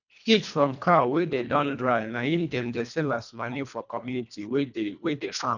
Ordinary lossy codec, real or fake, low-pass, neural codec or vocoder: none; fake; 7.2 kHz; codec, 24 kHz, 1.5 kbps, HILCodec